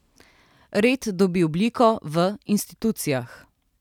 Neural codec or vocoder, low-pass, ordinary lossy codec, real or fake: none; 19.8 kHz; none; real